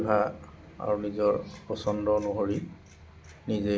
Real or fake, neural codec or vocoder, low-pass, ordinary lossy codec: real; none; none; none